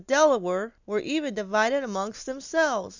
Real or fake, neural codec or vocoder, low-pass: real; none; 7.2 kHz